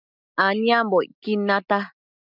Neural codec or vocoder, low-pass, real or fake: none; 5.4 kHz; real